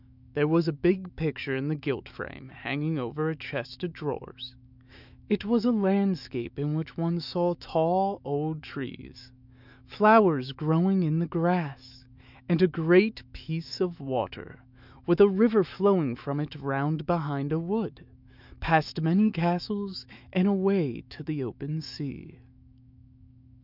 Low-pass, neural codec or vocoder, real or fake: 5.4 kHz; autoencoder, 48 kHz, 128 numbers a frame, DAC-VAE, trained on Japanese speech; fake